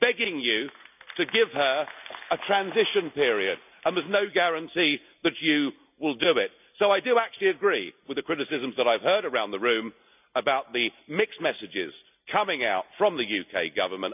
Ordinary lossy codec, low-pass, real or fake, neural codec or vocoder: none; 3.6 kHz; real; none